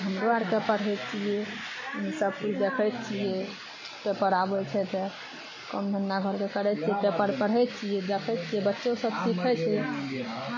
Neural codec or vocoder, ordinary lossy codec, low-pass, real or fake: none; MP3, 32 kbps; 7.2 kHz; real